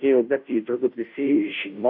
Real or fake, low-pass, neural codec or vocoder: fake; 5.4 kHz; codec, 16 kHz, 0.5 kbps, FunCodec, trained on Chinese and English, 25 frames a second